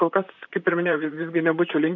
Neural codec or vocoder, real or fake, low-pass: vocoder, 44.1 kHz, 128 mel bands, Pupu-Vocoder; fake; 7.2 kHz